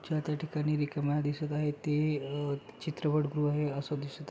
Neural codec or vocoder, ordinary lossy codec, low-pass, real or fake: none; none; none; real